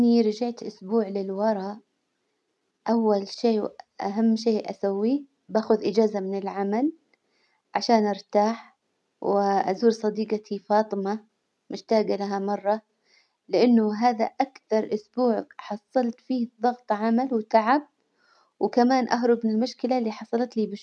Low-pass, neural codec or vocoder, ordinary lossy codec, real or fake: none; none; none; real